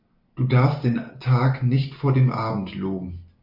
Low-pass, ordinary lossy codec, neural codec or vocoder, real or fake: 5.4 kHz; MP3, 48 kbps; none; real